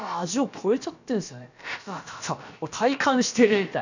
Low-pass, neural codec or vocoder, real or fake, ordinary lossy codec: 7.2 kHz; codec, 16 kHz, about 1 kbps, DyCAST, with the encoder's durations; fake; none